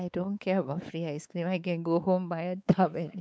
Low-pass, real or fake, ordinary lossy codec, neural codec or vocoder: none; fake; none; codec, 16 kHz, 4 kbps, X-Codec, WavLM features, trained on Multilingual LibriSpeech